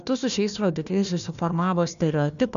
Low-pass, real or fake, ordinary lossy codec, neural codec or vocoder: 7.2 kHz; fake; MP3, 96 kbps; codec, 16 kHz, 1 kbps, FunCodec, trained on Chinese and English, 50 frames a second